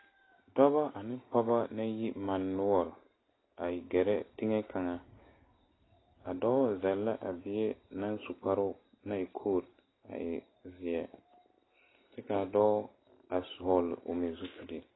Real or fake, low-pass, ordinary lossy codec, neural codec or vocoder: real; 7.2 kHz; AAC, 16 kbps; none